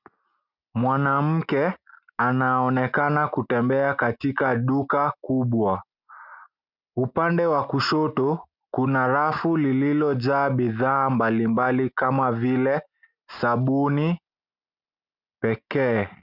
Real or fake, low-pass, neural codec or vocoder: real; 5.4 kHz; none